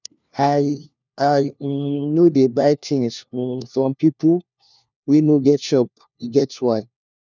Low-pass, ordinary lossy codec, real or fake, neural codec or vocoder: 7.2 kHz; none; fake; codec, 16 kHz, 1 kbps, FunCodec, trained on LibriTTS, 50 frames a second